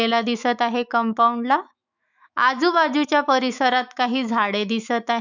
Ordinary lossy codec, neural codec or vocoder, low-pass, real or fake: none; autoencoder, 48 kHz, 128 numbers a frame, DAC-VAE, trained on Japanese speech; 7.2 kHz; fake